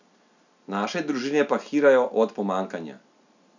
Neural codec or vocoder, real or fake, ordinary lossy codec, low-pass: none; real; none; 7.2 kHz